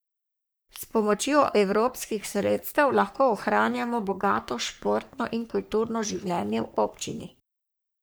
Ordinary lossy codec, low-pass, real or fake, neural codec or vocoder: none; none; fake; codec, 44.1 kHz, 3.4 kbps, Pupu-Codec